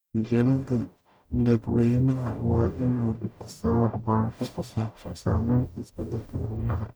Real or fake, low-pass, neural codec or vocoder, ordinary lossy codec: fake; none; codec, 44.1 kHz, 0.9 kbps, DAC; none